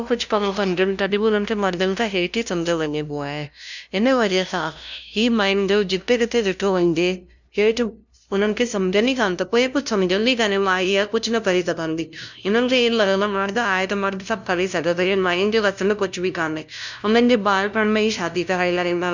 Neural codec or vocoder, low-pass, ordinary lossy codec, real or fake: codec, 16 kHz, 0.5 kbps, FunCodec, trained on LibriTTS, 25 frames a second; 7.2 kHz; none; fake